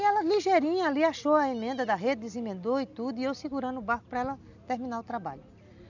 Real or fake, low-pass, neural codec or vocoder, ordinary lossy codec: real; 7.2 kHz; none; none